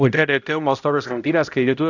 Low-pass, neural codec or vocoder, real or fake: 7.2 kHz; codec, 16 kHz, 0.5 kbps, X-Codec, HuBERT features, trained on balanced general audio; fake